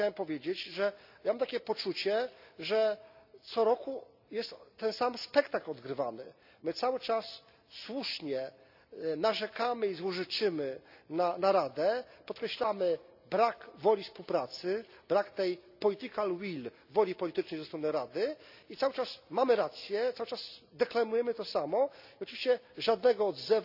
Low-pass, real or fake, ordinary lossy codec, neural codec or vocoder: 5.4 kHz; real; none; none